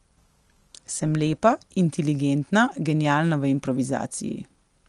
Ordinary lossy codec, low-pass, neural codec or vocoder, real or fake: Opus, 24 kbps; 10.8 kHz; none; real